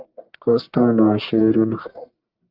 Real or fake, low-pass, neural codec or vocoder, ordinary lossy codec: fake; 5.4 kHz; codec, 44.1 kHz, 1.7 kbps, Pupu-Codec; Opus, 24 kbps